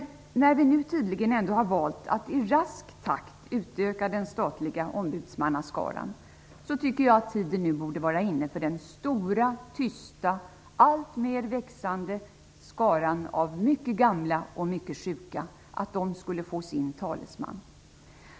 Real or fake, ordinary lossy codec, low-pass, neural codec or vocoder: real; none; none; none